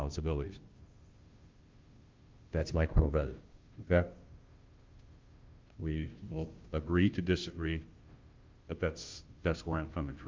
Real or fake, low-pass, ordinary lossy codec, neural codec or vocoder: fake; 7.2 kHz; Opus, 24 kbps; codec, 16 kHz, 0.5 kbps, FunCodec, trained on Chinese and English, 25 frames a second